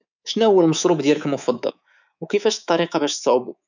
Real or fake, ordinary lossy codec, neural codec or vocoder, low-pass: fake; none; codec, 24 kHz, 3.1 kbps, DualCodec; 7.2 kHz